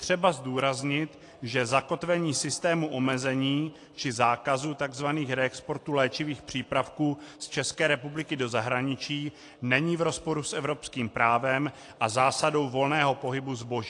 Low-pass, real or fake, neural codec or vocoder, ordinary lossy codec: 10.8 kHz; real; none; AAC, 48 kbps